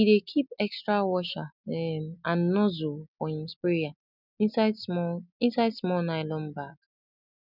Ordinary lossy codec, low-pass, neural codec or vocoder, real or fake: none; 5.4 kHz; none; real